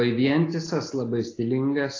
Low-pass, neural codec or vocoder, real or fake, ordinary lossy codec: 7.2 kHz; none; real; AAC, 32 kbps